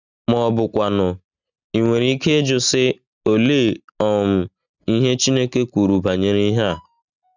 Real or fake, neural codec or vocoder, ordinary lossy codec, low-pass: real; none; none; 7.2 kHz